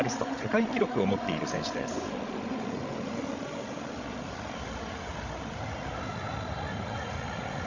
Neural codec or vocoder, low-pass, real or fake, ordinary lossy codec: codec, 16 kHz, 16 kbps, FreqCodec, larger model; 7.2 kHz; fake; Opus, 64 kbps